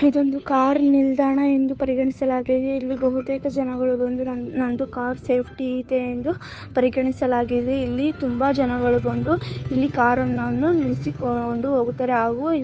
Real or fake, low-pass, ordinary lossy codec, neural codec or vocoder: fake; none; none; codec, 16 kHz, 2 kbps, FunCodec, trained on Chinese and English, 25 frames a second